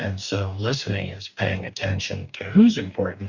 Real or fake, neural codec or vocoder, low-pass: fake; codec, 44.1 kHz, 2.6 kbps, DAC; 7.2 kHz